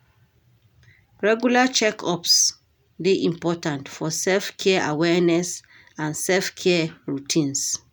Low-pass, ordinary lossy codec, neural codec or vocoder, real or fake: none; none; none; real